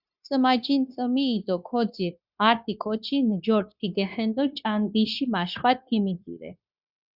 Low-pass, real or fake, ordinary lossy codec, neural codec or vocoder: 5.4 kHz; fake; Opus, 64 kbps; codec, 16 kHz, 0.9 kbps, LongCat-Audio-Codec